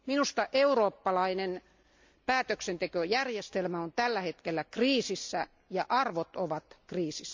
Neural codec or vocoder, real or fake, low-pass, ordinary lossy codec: none; real; 7.2 kHz; MP3, 64 kbps